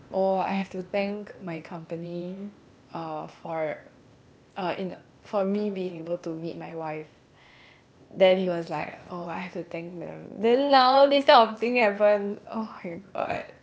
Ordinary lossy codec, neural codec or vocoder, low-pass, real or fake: none; codec, 16 kHz, 0.8 kbps, ZipCodec; none; fake